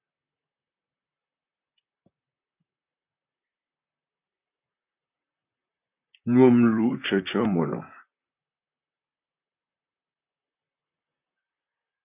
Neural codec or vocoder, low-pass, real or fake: vocoder, 44.1 kHz, 128 mel bands, Pupu-Vocoder; 3.6 kHz; fake